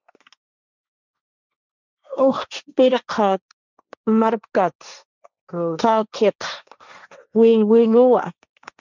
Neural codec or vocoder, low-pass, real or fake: codec, 16 kHz, 1.1 kbps, Voila-Tokenizer; 7.2 kHz; fake